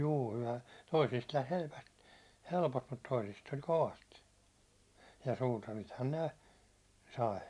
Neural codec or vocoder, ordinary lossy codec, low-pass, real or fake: none; none; none; real